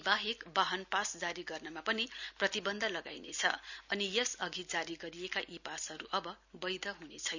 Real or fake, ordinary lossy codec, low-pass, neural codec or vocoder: real; none; 7.2 kHz; none